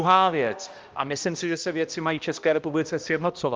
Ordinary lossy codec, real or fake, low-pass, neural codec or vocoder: Opus, 32 kbps; fake; 7.2 kHz; codec, 16 kHz, 1 kbps, X-Codec, HuBERT features, trained on balanced general audio